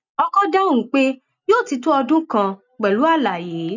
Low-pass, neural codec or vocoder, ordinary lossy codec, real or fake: 7.2 kHz; none; none; real